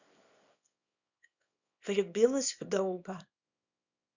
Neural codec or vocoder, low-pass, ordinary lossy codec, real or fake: codec, 24 kHz, 0.9 kbps, WavTokenizer, small release; 7.2 kHz; none; fake